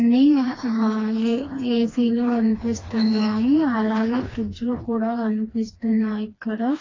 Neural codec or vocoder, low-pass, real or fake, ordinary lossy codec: codec, 16 kHz, 2 kbps, FreqCodec, smaller model; 7.2 kHz; fake; AAC, 32 kbps